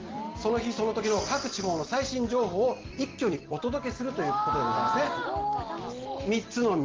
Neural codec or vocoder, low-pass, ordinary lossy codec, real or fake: none; 7.2 kHz; Opus, 16 kbps; real